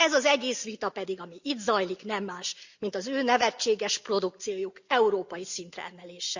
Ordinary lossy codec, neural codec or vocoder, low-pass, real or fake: Opus, 64 kbps; none; 7.2 kHz; real